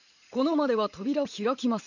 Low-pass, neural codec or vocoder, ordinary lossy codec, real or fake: 7.2 kHz; vocoder, 44.1 kHz, 80 mel bands, Vocos; none; fake